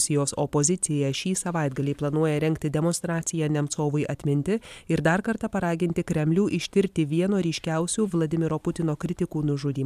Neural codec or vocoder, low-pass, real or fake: vocoder, 44.1 kHz, 128 mel bands every 512 samples, BigVGAN v2; 14.4 kHz; fake